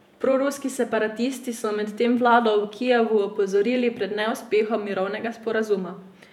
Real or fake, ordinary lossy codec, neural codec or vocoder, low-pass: fake; none; vocoder, 48 kHz, 128 mel bands, Vocos; 19.8 kHz